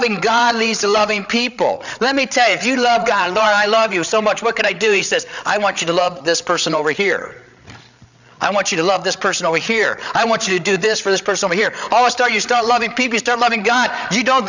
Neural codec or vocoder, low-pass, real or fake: codec, 16 kHz, 8 kbps, FreqCodec, larger model; 7.2 kHz; fake